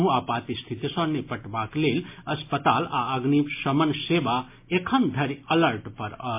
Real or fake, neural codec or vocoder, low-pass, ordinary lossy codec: real; none; 3.6 kHz; MP3, 24 kbps